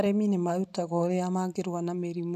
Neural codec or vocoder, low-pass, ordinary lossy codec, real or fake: none; 14.4 kHz; none; real